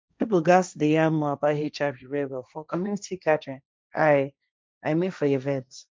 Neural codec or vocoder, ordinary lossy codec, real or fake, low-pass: codec, 16 kHz, 1.1 kbps, Voila-Tokenizer; none; fake; none